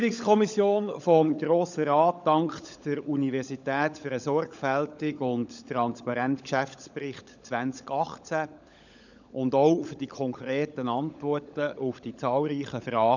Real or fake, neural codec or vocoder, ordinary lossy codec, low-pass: fake; codec, 16 kHz, 16 kbps, FunCodec, trained on LibriTTS, 50 frames a second; none; 7.2 kHz